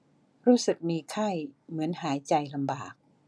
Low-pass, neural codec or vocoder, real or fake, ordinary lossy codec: none; none; real; none